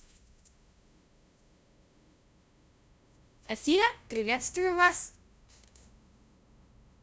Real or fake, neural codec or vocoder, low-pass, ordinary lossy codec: fake; codec, 16 kHz, 0.5 kbps, FunCodec, trained on LibriTTS, 25 frames a second; none; none